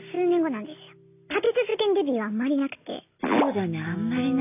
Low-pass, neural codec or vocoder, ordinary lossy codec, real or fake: 3.6 kHz; none; none; real